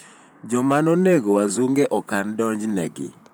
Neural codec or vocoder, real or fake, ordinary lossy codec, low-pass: vocoder, 44.1 kHz, 128 mel bands, Pupu-Vocoder; fake; none; none